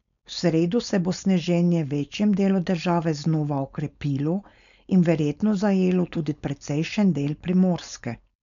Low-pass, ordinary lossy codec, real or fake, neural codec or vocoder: 7.2 kHz; none; fake; codec, 16 kHz, 4.8 kbps, FACodec